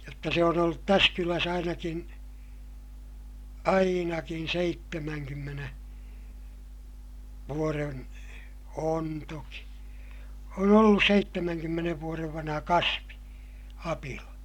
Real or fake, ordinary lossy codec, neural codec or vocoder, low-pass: real; MP3, 96 kbps; none; 19.8 kHz